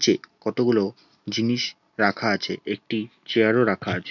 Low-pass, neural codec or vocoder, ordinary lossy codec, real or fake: 7.2 kHz; none; none; real